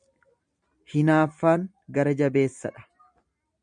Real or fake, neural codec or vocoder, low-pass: real; none; 9.9 kHz